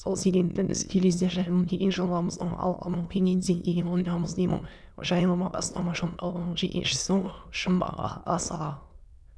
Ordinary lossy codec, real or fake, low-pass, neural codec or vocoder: none; fake; none; autoencoder, 22.05 kHz, a latent of 192 numbers a frame, VITS, trained on many speakers